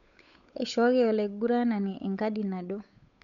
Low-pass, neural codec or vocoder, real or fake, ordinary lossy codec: 7.2 kHz; codec, 16 kHz, 8 kbps, FunCodec, trained on Chinese and English, 25 frames a second; fake; none